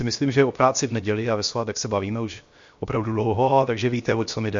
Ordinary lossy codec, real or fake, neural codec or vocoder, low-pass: MP3, 48 kbps; fake; codec, 16 kHz, 0.7 kbps, FocalCodec; 7.2 kHz